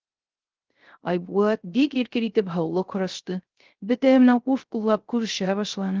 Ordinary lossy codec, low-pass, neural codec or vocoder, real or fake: Opus, 16 kbps; 7.2 kHz; codec, 16 kHz, 0.3 kbps, FocalCodec; fake